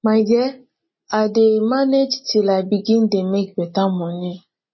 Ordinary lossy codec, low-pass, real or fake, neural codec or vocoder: MP3, 24 kbps; 7.2 kHz; real; none